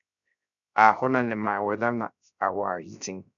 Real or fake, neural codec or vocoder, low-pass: fake; codec, 16 kHz, 0.3 kbps, FocalCodec; 7.2 kHz